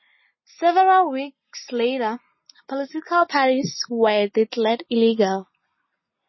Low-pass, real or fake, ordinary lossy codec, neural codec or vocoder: 7.2 kHz; real; MP3, 24 kbps; none